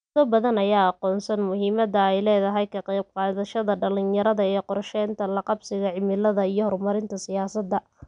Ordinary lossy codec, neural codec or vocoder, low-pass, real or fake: none; none; 9.9 kHz; real